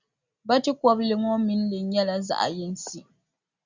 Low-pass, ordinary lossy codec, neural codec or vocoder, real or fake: 7.2 kHz; Opus, 64 kbps; none; real